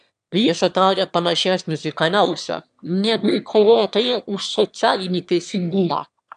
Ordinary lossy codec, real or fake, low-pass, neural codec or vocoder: AAC, 96 kbps; fake; 9.9 kHz; autoencoder, 22.05 kHz, a latent of 192 numbers a frame, VITS, trained on one speaker